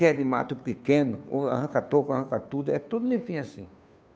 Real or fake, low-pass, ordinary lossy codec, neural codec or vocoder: fake; none; none; codec, 16 kHz, 2 kbps, FunCodec, trained on Chinese and English, 25 frames a second